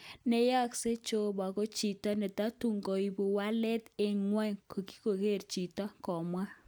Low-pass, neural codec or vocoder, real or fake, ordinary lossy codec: none; none; real; none